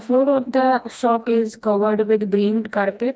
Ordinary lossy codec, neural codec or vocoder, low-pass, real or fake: none; codec, 16 kHz, 1 kbps, FreqCodec, smaller model; none; fake